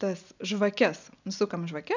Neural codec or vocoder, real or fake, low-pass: none; real; 7.2 kHz